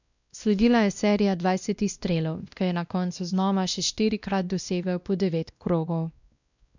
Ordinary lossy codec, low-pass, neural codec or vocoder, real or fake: none; 7.2 kHz; codec, 16 kHz, 1 kbps, X-Codec, WavLM features, trained on Multilingual LibriSpeech; fake